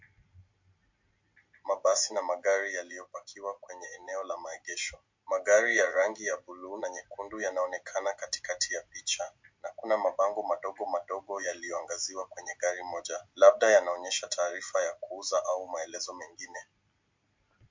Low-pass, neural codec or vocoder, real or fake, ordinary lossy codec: 7.2 kHz; none; real; MP3, 48 kbps